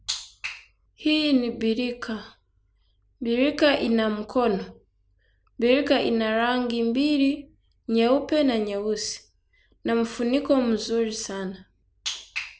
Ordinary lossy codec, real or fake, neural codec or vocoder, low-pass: none; real; none; none